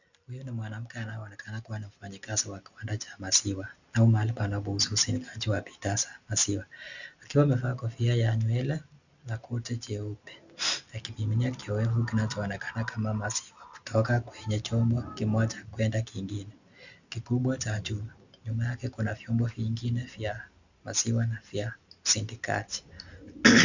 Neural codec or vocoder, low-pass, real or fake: none; 7.2 kHz; real